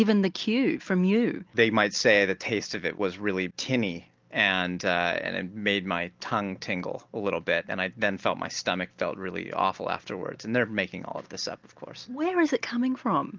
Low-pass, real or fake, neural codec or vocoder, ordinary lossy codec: 7.2 kHz; real; none; Opus, 32 kbps